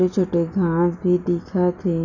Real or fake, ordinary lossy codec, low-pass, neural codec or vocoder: real; none; 7.2 kHz; none